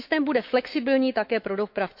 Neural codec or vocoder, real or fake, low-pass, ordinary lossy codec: autoencoder, 48 kHz, 128 numbers a frame, DAC-VAE, trained on Japanese speech; fake; 5.4 kHz; MP3, 48 kbps